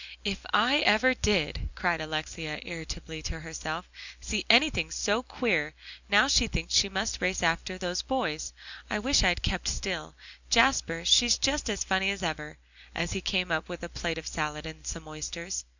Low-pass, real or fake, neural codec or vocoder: 7.2 kHz; real; none